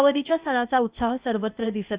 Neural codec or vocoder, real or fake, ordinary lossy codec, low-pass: codec, 16 kHz, 0.8 kbps, ZipCodec; fake; Opus, 64 kbps; 3.6 kHz